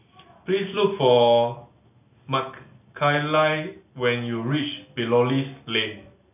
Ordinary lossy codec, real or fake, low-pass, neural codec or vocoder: none; real; 3.6 kHz; none